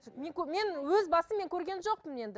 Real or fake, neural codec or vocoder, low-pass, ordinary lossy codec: real; none; none; none